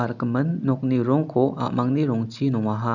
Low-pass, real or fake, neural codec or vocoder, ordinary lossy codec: 7.2 kHz; real; none; none